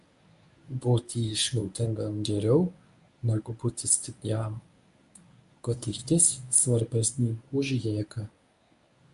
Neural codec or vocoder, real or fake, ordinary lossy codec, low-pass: codec, 24 kHz, 0.9 kbps, WavTokenizer, medium speech release version 1; fake; AAC, 96 kbps; 10.8 kHz